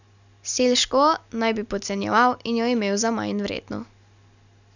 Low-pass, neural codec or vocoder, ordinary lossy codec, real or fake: 7.2 kHz; none; none; real